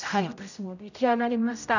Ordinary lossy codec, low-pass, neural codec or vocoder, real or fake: none; 7.2 kHz; codec, 16 kHz, 0.5 kbps, X-Codec, HuBERT features, trained on general audio; fake